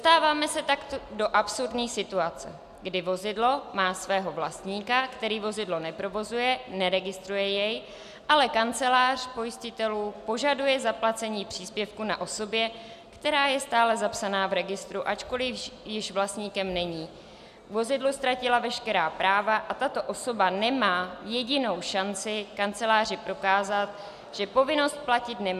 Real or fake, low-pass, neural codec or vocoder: real; 14.4 kHz; none